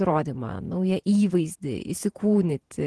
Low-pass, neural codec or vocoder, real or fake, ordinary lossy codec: 10.8 kHz; vocoder, 48 kHz, 128 mel bands, Vocos; fake; Opus, 16 kbps